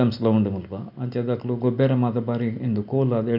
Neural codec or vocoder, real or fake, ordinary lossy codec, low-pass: none; real; none; 5.4 kHz